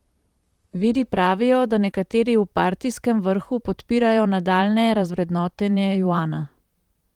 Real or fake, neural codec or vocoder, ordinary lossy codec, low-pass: fake; vocoder, 44.1 kHz, 128 mel bands, Pupu-Vocoder; Opus, 24 kbps; 19.8 kHz